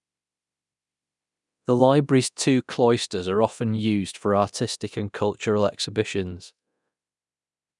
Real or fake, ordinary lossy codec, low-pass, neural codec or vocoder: fake; none; 10.8 kHz; codec, 24 kHz, 0.9 kbps, DualCodec